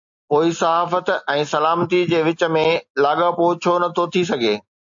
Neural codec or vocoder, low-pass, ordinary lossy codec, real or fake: none; 7.2 kHz; AAC, 64 kbps; real